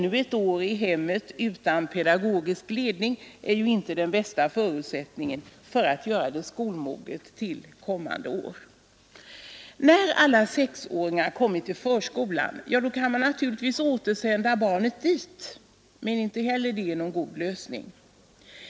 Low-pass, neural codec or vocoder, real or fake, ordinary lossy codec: none; none; real; none